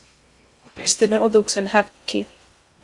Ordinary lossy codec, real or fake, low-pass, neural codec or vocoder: Opus, 64 kbps; fake; 10.8 kHz; codec, 16 kHz in and 24 kHz out, 0.6 kbps, FocalCodec, streaming, 2048 codes